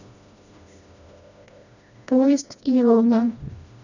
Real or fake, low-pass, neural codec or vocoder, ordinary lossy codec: fake; 7.2 kHz; codec, 16 kHz, 1 kbps, FreqCodec, smaller model; none